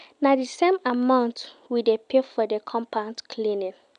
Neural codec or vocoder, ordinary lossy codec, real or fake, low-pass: none; none; real; 9.9 kHz